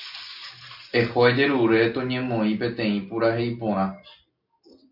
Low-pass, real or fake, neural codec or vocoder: 5.4 kHz; real; none